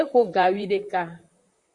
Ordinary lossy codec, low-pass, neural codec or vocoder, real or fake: Opus, 64 kbps; 10.8 kHz; vocoder, 44.1 kHz, 128 mel bands, Pupu-Vocoder; fake